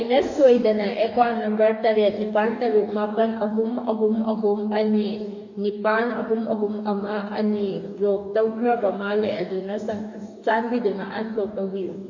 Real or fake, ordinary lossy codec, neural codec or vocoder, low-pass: fake; AAC, 48 kbps; codec, 44.1 kHz, 2.6 kbps, DAC; 7.2 kHz